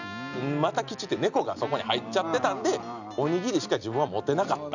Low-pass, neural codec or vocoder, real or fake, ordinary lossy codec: 7.2 kHz; none; real; none